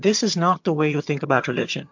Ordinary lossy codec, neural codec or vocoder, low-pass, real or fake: MP3, 48 kbps; vocoder, 22.05 kHz, 80 mel bands, HiFi-GAN; 7.2 kHz; fake